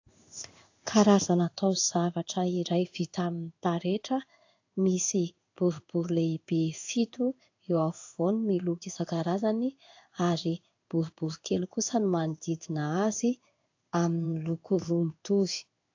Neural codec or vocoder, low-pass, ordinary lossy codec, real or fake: codec, 16 kHz in and 24 kHz out, 1 kbps, XY-Tokenizer; 7.2 kHz; AAC, 48 kbps; fake